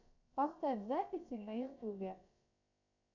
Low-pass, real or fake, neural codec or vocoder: 7.2 kHz; fake; codec, 16 kHz, about 1 kbps, DyCAST, with the encoder's durations